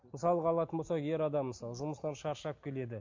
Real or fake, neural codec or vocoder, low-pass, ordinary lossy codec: real; none; 7.2 kHz; MP3, 48 kbps